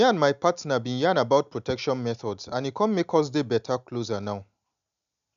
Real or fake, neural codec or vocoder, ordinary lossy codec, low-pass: real; none; none; 7.2 kHz